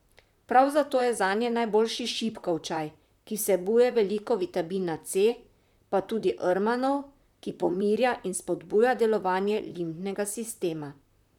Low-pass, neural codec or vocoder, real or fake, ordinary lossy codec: 19.8 kHz; vocoder, 44.1 kHz, 128 mel bands, Pupu-Vocoder; fake; none